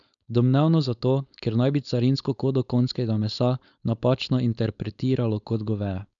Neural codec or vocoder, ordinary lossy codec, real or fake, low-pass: codec, 16 kHz, 4.8 kbps, FACodec; none; fake; 7.2 kHz